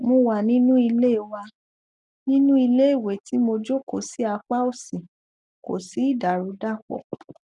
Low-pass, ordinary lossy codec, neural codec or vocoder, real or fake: 10.8 kHz; none; none; real